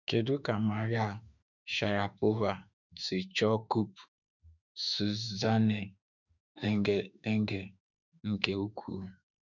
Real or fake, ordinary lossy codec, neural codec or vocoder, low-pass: fake; none; autoencoder, 48 kHz, 32 numbers a frame, DAC-VAE, trained on Japanese speech; 7.2 kHz